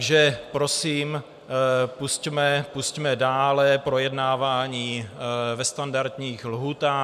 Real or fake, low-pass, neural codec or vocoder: real; 14.4 kHz; none